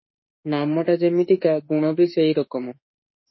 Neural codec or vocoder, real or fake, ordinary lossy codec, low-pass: autoencoder, 48 kHz, 32 numbers a frame, DAC-VAE, trained on Japanese speech; fake; MP3, 24 kbps; 7.2 kHz